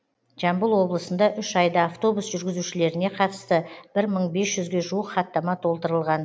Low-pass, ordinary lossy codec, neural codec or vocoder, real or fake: none; none; none; real